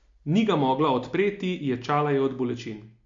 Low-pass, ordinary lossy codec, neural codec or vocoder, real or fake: 7.2 kHz; MP3, 48 kbps; none; real